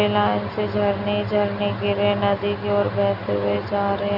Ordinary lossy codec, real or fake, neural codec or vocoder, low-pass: none; real; none; 5.4 kHz